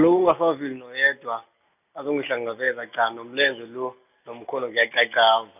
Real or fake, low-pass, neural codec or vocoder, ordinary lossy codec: real; 3.6 kHz; none; none